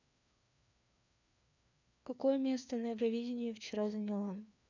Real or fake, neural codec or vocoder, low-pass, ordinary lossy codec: fake; codec, 16 kHz, 2 kbps, FreqCodec, larger model; 7.2 kHz; none